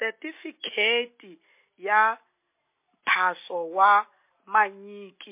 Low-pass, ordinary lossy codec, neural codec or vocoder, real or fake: 3.6 kHz; MP3, 24 kbps; none; real